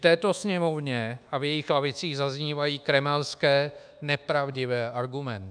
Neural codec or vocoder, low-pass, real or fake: codec, 24 kHz, 1.2 kbps, DualCodec; 9.9 kHz; fake